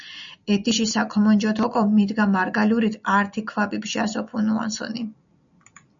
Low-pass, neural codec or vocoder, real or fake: 7.2 kHz; none; real